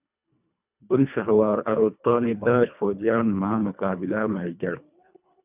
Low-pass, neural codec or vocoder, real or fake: 3.6 kHz; codec, 24 kHz, 1.5 kbps, HILCodec; fake